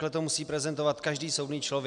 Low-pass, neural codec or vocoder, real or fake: 10.8 kHz; none; real